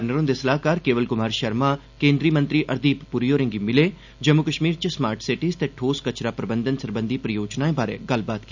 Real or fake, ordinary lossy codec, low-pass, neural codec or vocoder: real; none; 7.2 kHz; none